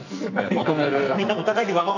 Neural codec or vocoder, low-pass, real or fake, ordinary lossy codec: codec, 44.1 kHz, 2.6 kbps, SNAC; 7.2 kHz; fake; none